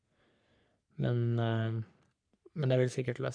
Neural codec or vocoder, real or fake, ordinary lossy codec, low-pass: codec, 44.1 kHz, 3.4 kbps, Pupu-Codec; fake; none; 9.9 kHz